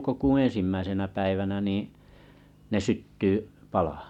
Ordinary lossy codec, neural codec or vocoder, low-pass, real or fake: none; none; 19.8 kHz; real